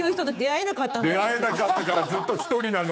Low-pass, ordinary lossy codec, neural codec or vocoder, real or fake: none; none; codec, 16 kHz, 4 kbps, X-Codec, HuBERT features, trained on general audio; fake